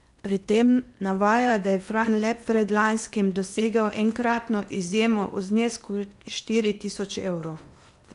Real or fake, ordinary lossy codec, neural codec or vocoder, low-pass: fake; none; codec, 16 kHz in and 24 kHz out, 0.8 kbps, FocalCodec, streaming, 65536 codes; 10.8 kHz